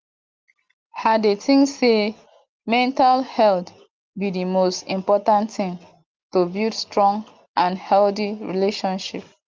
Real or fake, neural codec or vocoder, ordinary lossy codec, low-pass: real; none; Opus, 24 kbps; 7.2 kHz